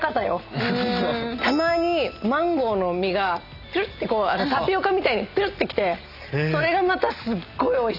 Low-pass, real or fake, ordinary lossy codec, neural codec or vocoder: 5.4 kHz; real; none; none